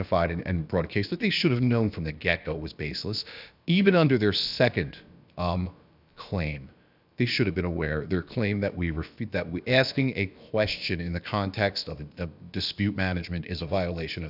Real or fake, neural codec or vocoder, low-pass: fake; codec, 16 kHz, about 1 kbps, DyCAST, with the encoder's durations; 5.4 kHz